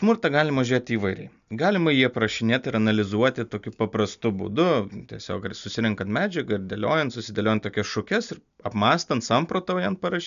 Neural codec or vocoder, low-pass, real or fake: none; 7.2 kHz; real